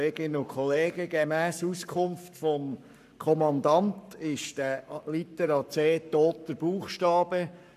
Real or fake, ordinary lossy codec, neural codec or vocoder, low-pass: fake; none; codec, 44.1 kHz, 7.8 kbps, Pupu-Codec; 14.4 kHz